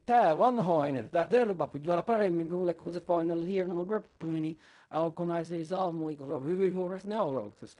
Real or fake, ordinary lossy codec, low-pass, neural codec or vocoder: fake; none; 10.8 kHz; codec, 16 kHz in and 24 kHz out, 0.4 kbps, LongCat-Audio-Codec, fine tuned four codebook decoder